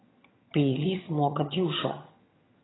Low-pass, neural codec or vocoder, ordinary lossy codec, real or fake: 7.2 kHz; vocoder, 22.05 kHz, 80 mel bands, HiFi-GAN; AAC, 16 kbps; fake